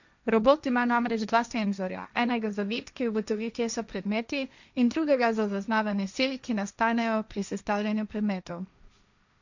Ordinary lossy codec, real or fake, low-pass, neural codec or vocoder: none; fake; 7.2 kHz; codec, 16 kHz, 1.1 kbps, Voila-Tokenizer